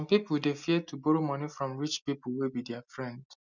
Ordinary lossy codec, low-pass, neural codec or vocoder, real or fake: none; 7.2 kHz; none; real